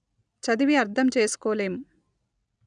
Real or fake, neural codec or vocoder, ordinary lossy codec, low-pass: real; none; none; 9.9 kHz